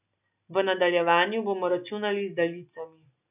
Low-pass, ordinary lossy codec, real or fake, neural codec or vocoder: 3.6 kHz; none; real; none